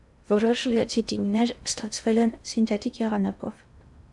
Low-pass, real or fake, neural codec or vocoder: 10.8 kHz; fake; codec, 16 kHz in and 24 kHz out, 0.6 kbps, FocalCodec, streaming, 2048 codes